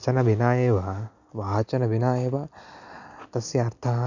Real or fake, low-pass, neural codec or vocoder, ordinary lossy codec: real; 7.2 kHz; none; none